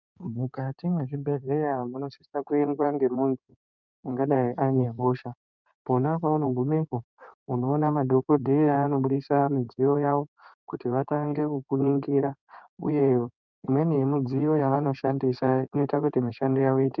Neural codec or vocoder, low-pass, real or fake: codec, 16 kHz in and 24 kHz out, 2.2 kbps, FireRedTTS-2 codec; 7.2 kHz; fake